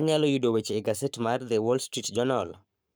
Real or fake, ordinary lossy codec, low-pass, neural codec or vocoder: fake; none; none; codec, 44.1 kHz, 7.8 kbps, Pupu-Codec